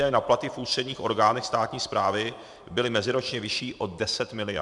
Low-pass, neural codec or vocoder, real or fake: 10.8 kHz; vocoder, 44.1 kHz, 128 mel bands every 512 samples, BigVGAN v2; fake